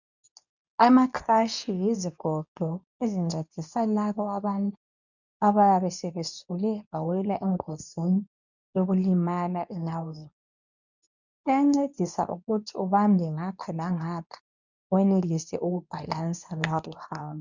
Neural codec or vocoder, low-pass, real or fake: codec, 24 kHz, 0.9 kbps, WavTokenizer, medium speech release version 2; 7.2 kHz; fake